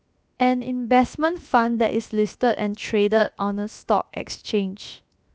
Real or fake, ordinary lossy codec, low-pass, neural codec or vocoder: fake; none; none; codec, 16 kHz, 0.7 kbps, FocalCodec